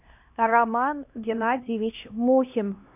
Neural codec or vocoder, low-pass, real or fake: codec, 16 kHz, 1 kbps, X-Codec, HuBERT features, trained on LibriSpeech; 3.6 kHz; fake